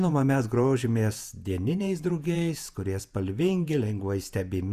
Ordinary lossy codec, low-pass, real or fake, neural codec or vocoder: Opus, 64 kbps; 14.4 kHz; fake; vocoder, 48 kHz, 128 mel bands, Vocos